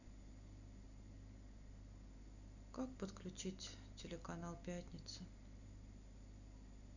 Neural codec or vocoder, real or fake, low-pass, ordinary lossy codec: none; real; 7.2 kHz; none